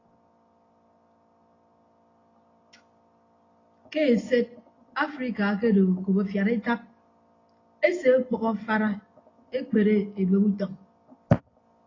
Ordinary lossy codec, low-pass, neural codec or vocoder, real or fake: AAC, 32 kbps; 7.2 kHz; none; real